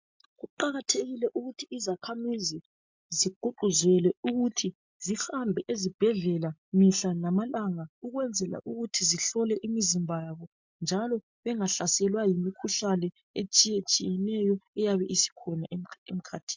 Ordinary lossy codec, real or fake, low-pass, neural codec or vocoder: MP3, 64 kbps; real; 7.2 kHz; none